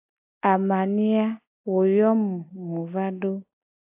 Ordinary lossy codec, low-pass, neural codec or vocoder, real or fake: AAC, 32 kbps; 3.6 kHz; none; real